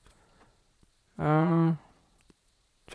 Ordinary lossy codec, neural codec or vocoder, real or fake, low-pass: none; vocoder, 22.05 kHz, 80 mel bands, Vocos; fake; none